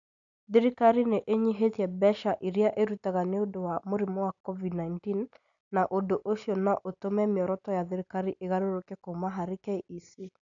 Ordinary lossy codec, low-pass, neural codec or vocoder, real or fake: none; 7.2 kHz; none; real